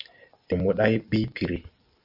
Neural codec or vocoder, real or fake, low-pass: none; real; 5.4 kHz